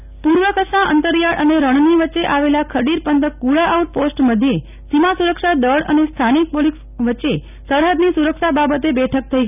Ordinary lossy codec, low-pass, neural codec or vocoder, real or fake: none; 3.6 kHz; none; real